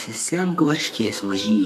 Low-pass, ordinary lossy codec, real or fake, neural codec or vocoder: 14.4 kHz; AAC, 64 kbps; fake; codec, 32 kHz, 1.9 kbps, SNAC